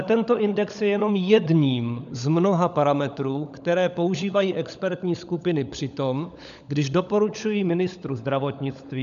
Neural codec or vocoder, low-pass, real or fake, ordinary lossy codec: codec, 16 kHz, 16 kbps, FunCodec, trained on LibriTTS, 50 frames a second; 7.2 kHz; fake; AAC, 96 kbps